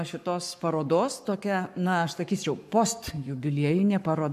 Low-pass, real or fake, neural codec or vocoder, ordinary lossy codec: 14.4 kHz; fake; codec, 44.1 kHz, 7.8 kbps, Pupu-Codec; AAC, 96 kbps